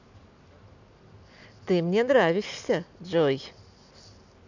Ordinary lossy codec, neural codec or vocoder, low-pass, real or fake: none; none; 7.2 kHz; real